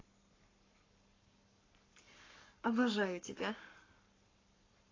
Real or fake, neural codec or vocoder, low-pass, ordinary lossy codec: fake; codec, 44.1 kHz, 7.8 kbps, Pupu-Codec; 7.2 kHz; AAC, 32 kbps